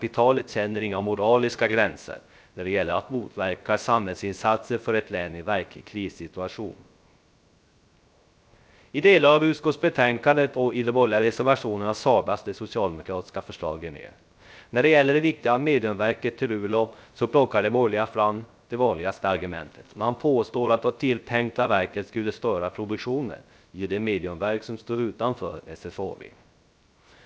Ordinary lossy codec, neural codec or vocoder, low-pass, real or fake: none; codec, 16 kHz, 0.3 kbps, FocalCodec; none; fake